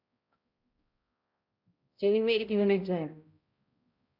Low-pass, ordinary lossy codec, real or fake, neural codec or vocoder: 5.4 kHz; Opus, 64 kbps; fake; codec, 16 kHz, 0.5 kbps, X-Codec, HuBERT features, trained on balanced general audio